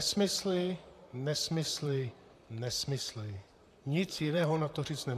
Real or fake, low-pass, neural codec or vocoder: fake; 14.4 kHz; vocoder, 44.1 kHz, 128 mel bands, Pupu-Vocoder